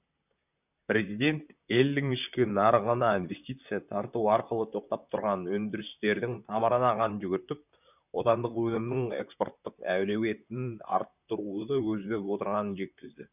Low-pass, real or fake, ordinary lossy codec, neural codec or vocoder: 3.6 kHz; fake; none; vocoder, 44.1 kHz, 128 mel bands, Pupu-Vocoder